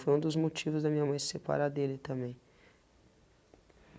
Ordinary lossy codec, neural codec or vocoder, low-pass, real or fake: none; none; none; real